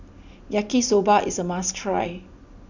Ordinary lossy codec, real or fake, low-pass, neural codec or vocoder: none; real; 7.2 kHz; none